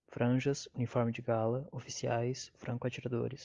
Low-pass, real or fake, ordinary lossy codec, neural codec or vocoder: 7.2 kHz; real; Opus, 32 kbps; none